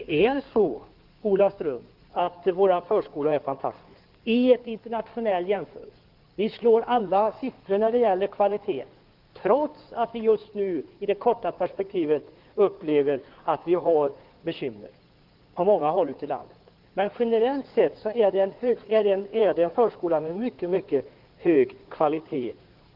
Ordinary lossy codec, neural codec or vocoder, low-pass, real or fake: Opus, 32 kbps; codec, 16 kHz in and 24 kHz out, 2.2 kbps, FireRedTTS-2 codec; 5.4 kHz; fake